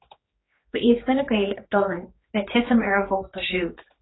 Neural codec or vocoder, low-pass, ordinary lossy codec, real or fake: codec, 16 kHz, 4 kbps, X-Codec, HuBERT features, trained on general audio; 7.2 kHz; AAC, 16 kbps; fake